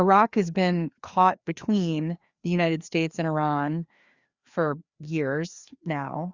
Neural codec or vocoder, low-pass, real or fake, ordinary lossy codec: codec, 16 kHz, 2 kbps, FreqCodec, larger model; 7.2 kHz; fake; Opus, 64 kbps